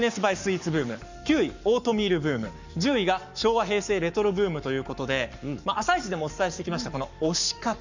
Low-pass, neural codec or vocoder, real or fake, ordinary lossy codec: 7.2 kHz; codec, 44.1 kHz, 7.8 kbps, Pupu-Codec; fake; none